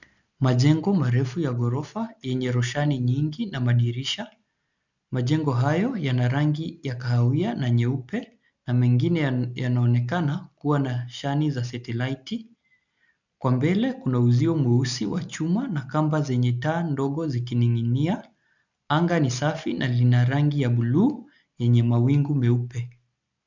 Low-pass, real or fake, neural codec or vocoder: 7.2 kHz; real; none